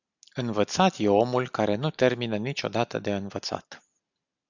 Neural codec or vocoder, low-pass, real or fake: none; 7.2 kHz; real